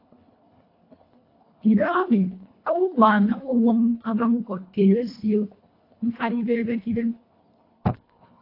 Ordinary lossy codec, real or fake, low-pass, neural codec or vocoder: AAC, 32 kbps; fake; 5.4 kHz; codec, 24 kHz, 1.5 kbps, HILCodec